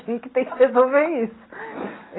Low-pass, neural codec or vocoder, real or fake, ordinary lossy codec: 7.2 kHz; none; real; AAC, 16 kbps